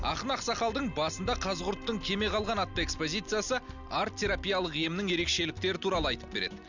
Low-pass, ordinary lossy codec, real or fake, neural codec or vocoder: 7.2 kHz; none; real; none